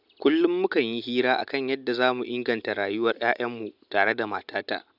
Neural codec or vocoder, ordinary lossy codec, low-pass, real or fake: none; none; 5.4 kHz; real